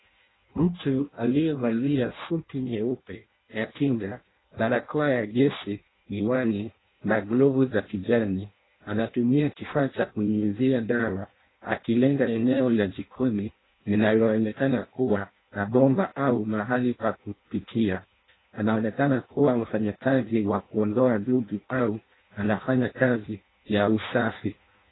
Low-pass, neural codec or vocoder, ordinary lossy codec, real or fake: 7.2 kHz; codec, 16 kHz in and 24 kHz out, 0.6 kbps, FireRedTTS-2 codec; AAC, 16 kbps; fake